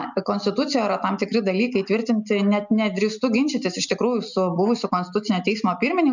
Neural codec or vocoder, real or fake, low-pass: none; real; 7.2 kHz